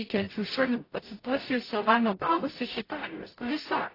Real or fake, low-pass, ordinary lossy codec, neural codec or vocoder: fake; 5.4 kHz; AAC, 24 kbps; codec, 44.1 kHz, 0.9 kbps, DAC